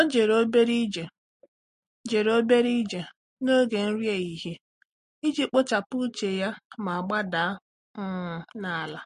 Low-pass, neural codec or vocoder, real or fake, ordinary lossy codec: 14.4 kHz; none; real; MP3, 48 kbps